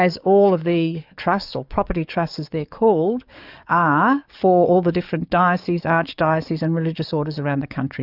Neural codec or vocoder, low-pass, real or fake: codec, 44.1 kHz, 7.8 kbps, DAC; 5.4 kHz; fake